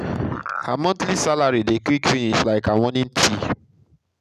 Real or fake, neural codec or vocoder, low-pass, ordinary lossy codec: real; none; 14.4 kHz; none